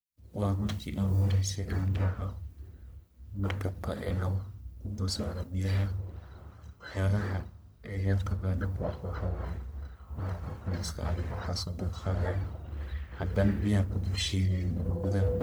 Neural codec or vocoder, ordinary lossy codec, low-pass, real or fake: codec, 44.1 kHz, 1.7 kbps, Pupu-Codec; none; none; fake